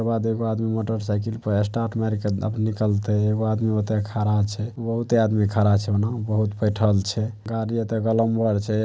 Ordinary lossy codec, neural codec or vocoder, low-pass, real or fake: none; none; none; real